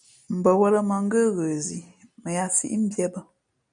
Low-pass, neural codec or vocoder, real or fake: 9.9 kHz; none; real